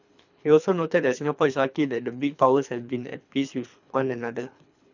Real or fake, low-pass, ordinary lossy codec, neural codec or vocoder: fake; 7.2 kHz; none; codec, 24 kHz, 3 kbps, HILCodec